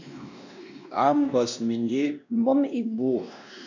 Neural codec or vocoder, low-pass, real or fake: codec, 16 kHz, 1 kbps, X-Codec, WavLM features, trained on Multilingual LibriSpeech; 7.2 kHz; fake